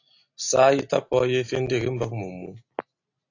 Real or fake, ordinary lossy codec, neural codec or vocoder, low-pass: real; AAC, 48 kbps; none; 7.2 kHz